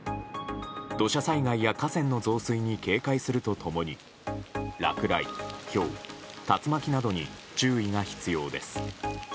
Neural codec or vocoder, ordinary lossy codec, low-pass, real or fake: none; none; none; real